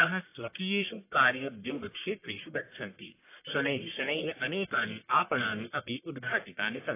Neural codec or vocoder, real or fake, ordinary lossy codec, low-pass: codec, 44.1 kHz, 1.7 kbps, Pupu-Codec; fake; AAC, 24 kbps; 3.6 kHz